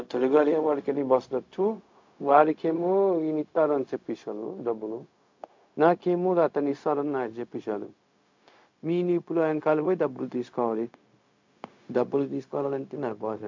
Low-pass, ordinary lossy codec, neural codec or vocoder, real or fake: 7.2 kHz; MP3, 48 kbps; codec, 16 kHz, 0.4 kbps, LongCat-Audio-Codec; fake